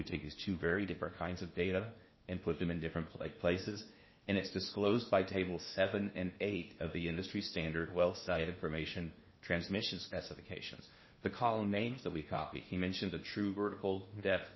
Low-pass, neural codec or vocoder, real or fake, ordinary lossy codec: 7.2 kHz; codec, 16 kHz in and 24 kHz out, 0.8 kbps, FocalCodec, streaming, 65536 codes; fake; MP3, 24 kbps